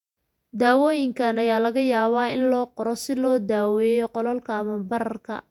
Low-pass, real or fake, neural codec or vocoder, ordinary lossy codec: 19.8 kHz; fake; vocoder, 48 kHz, 128 mel bands, Vocos; none